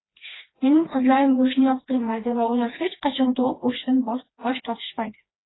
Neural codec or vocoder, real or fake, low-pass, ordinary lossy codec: codec, 16 kHz, 2 kbps, FreqCodec, smaller model; fake; 7.2 kHz; AAC, 16 kbps